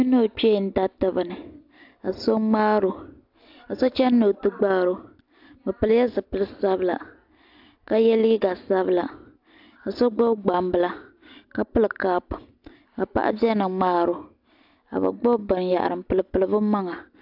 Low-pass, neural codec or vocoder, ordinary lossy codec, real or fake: 5.4 kHz; none; AAC, 48 kbps; real